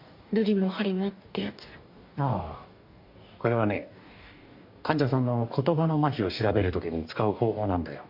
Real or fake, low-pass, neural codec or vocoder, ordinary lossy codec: fake; 5.4 kHz; codec, 44.1 kHz, 2.6 kbps, DAC; MP3, 48 kbps